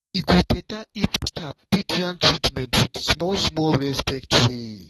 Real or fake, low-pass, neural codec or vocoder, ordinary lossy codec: fake; 14.4 kHz; codec, 32 kHz, 1.9 kbps, SNAC; AAC, 32 kbps